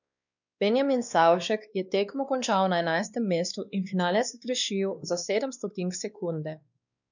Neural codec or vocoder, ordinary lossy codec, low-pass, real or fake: codec, 16 kHz, 2 kbps, X-Codec, WavLM features, trained on Multilingual LibriSpeech; none; 7.2 kHz; fake